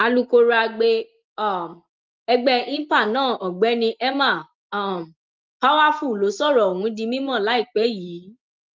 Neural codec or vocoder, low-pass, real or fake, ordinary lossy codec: none; 7.2 kHz; real; Opus, 32 kbps